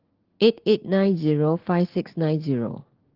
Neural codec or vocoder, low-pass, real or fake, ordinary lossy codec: codec, 16 kHz, 6 kbps, DAC; 5.4 kHz; fake; Opus, 16 kbps